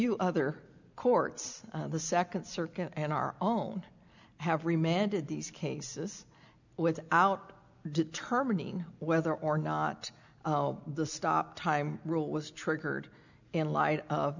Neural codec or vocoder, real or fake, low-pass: none; real; 7.2 kHz